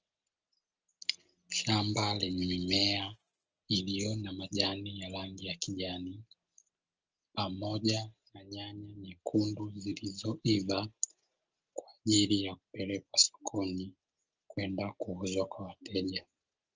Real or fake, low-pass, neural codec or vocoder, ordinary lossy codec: real; 7.2 kHz; none; Opus, 24 kbps